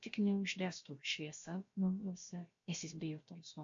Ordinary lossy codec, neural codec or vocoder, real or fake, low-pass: MP3, 48 kbps; codec, 16 kHz, about 1 kbps, DyCAST, with the encoder's durations; fake; 7.2 kHz